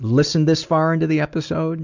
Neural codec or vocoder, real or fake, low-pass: none; real; 7.2 kHz